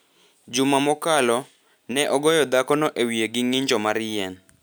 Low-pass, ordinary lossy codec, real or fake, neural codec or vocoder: none; none; real; none